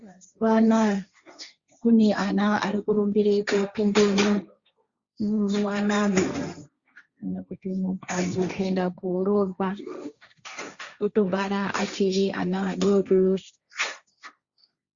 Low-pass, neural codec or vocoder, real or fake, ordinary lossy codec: 7.2 kHz; codec, 16 kHz, 1.1 kbps, Voila-Tokenizer; fake; Opus, 64 kbps